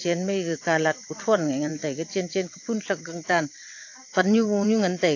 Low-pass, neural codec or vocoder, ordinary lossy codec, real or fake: 7.2 kHz; vocoder, 22.05 kHz, 80 mel bands, WaveNeXt; AAC, 48 kbps; fake